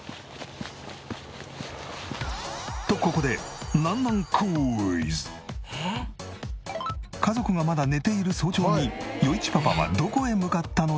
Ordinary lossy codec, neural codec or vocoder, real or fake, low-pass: none; none; real; none